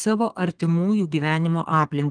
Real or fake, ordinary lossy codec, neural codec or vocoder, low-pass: fake; Opus, 24 kbps; codec, 32 kHz, 1.9 kbps, SNAC; 9.9 kHz